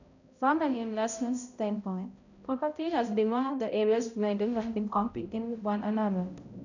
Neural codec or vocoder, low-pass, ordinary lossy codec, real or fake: codec, 16 kHz, 0.5 kbps, X-Codec, HuBERT features, trained on balanced general audio; 7.2 kHz; none; fake